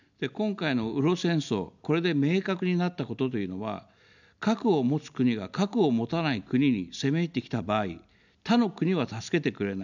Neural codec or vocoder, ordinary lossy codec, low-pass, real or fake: none; none; 7.2 kHz; real